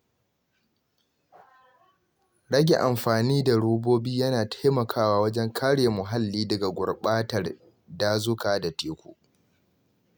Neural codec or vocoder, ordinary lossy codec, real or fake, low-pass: none; none; real; none